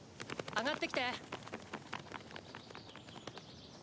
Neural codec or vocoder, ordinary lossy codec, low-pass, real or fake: none; none; none; real